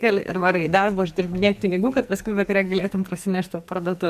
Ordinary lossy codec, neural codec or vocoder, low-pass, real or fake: MP3, 96 kbps; codec, 44.1 kHz, 2.6 kbps, SNAC; 14.4 kHz; fake